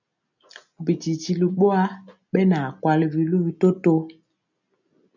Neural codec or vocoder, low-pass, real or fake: none; 7.2 kHz; real